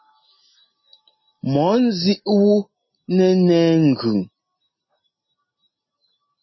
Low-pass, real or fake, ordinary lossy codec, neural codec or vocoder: 7.2 kHz; real; MP3, 24 kbps; none